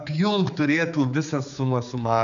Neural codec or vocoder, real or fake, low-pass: codec, 16 kHz, 4 kbps, X-Codec, HuBERT features, trained on general audio; fake; 7.2 kHz